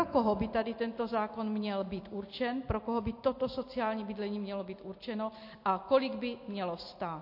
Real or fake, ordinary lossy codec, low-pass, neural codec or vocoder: real; MP3, 32 kbps; 5.4 kHz; none